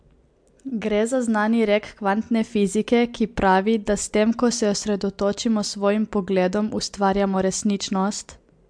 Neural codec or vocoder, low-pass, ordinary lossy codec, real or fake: none; 9.9 kHz; MP3, 64 kbps; real